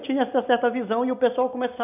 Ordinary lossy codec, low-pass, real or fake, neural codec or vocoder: none; 3.6 kHz; real; none